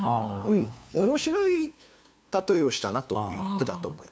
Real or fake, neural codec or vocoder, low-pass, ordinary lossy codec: fake; codec, 16 kHz, 2 kbps, FunCodec, trained on LibriTTS, 25 frames a second; none; none